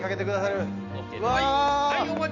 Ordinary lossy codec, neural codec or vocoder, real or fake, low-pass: none; none; real; 7.2 kHz